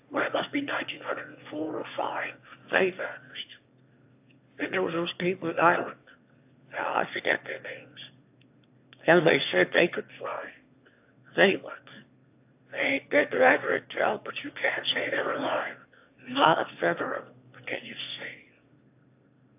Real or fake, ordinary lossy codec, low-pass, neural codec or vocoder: fake; AAC, 32 kbps; 3.6 kHz; autoencoder, 22.05 kHz, a latent of 192 numbers a frame, VITS, trained on one speaker